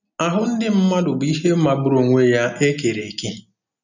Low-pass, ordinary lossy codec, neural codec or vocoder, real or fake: 7.2 kHz; none; none; real